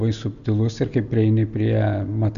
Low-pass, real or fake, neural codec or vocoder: 7.2 kHz; real; none